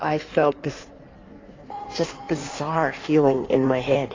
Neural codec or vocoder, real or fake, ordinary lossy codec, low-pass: codec, 16 kHz in and 24 kHz out, 1.1 kbps, FireRedTTS-2 codec; fake; AAC, 32 kbps; 7.2 kHz